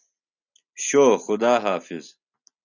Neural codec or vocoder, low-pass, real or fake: none; 7.2 kHz; real